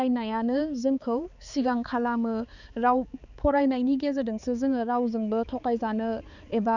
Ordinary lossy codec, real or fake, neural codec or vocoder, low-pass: none; fake; codec, 16 kHz, 4 kbps, X-Codec, HuBERT features, trained on balanced general audio; 7.2 kHz